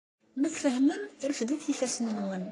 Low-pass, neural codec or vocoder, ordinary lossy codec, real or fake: 10.8 kHz; codec, 44.1 kHz, 3.4 kbps, Pupu-Codec; AAC, 48 kbps; fake